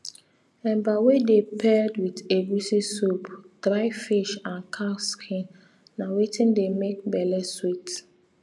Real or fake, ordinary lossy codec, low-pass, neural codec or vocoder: real; none; none; none